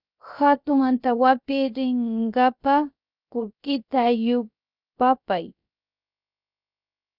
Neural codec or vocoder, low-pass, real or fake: codec, 16 kHz, about 1 kbps, DyCAST, with the encoder's durations; 5.4 kHz; fake